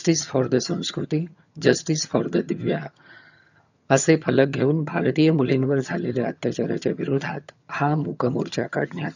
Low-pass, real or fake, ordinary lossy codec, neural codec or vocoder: 7.2 kHz; fake; none; vocoder, 22.05 kHz, 80 mel bands, HiFi-GAN